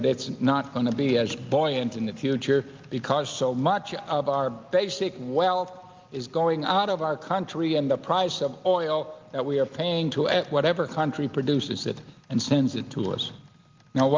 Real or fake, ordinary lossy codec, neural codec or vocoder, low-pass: real; Opus, 24 kbps; none; 7.2 kHz